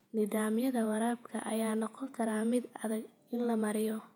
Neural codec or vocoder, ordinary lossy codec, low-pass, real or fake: vocoder, 48 kHz, 128 mel bands, Vocos; none; 19.8 kHz; fake